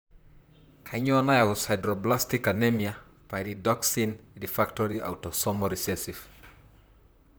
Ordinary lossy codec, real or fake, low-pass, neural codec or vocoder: none; fake; none; vocoder, 44.1 kHz, 128 mel bands, Pupu-Vocoder